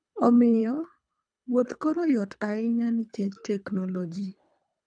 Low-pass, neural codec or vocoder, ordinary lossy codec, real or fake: 9.9 kHz; codec, 24 kHz, 3 kbps, HILCodec; none; fake